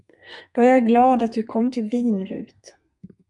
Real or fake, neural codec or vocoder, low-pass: fake; codec, 32 kHz, 1.9 kbps, SNAC; 10.8 kHz